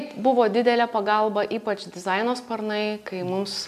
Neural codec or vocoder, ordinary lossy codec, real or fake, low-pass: none; AAC, 96 kbps; real; 14.4 kHz